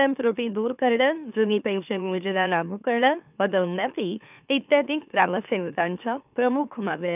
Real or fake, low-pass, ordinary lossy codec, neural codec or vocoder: fake; 3.6 kHz; none; autoencoder, 44.1 kHz, a latent of 192 numbers a frame, MeloTTS